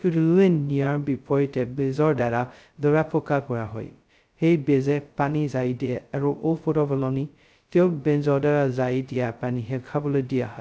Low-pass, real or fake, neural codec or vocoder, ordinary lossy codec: none; fake; codec, 16 kHz, 0.2 kbps, FocalCodec; none